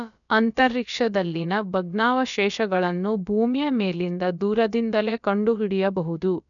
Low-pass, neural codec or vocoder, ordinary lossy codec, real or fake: 7.2 kHz; codec, 16 kHz, about 1 kbps, DyCAST, with the encoder's durations; none; fake